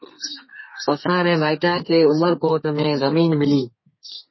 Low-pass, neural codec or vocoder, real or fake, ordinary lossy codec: 7.2 kHz; codec, 32 kHz, 1.9 kbps, SNAC; fake; MP3, 24 kbps